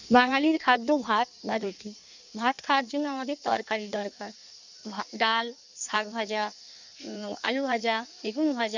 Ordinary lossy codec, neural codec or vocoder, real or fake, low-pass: none; codec, 16 kHz in and 24 kHz out, 1.1 kbps, FireRedTTS-2 codec; fake; 7.2 kHz